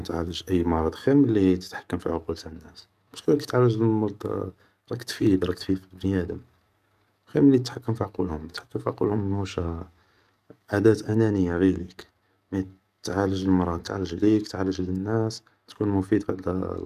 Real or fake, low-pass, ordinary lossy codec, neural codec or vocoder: fake; 14.4 kHz; none; codec, 44.1 kHz, 7.8 kbps, DAC